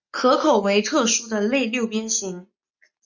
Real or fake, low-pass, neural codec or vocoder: real; 7.2 kHz; none